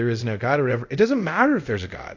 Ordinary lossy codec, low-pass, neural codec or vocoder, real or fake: AAC, 48 kbps; 7.2 kHz; codec, 24 kHz, 0.5 kbps, DualCodec; fake